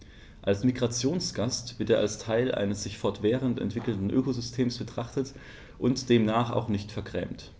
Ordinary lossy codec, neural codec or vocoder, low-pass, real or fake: none; none; none; real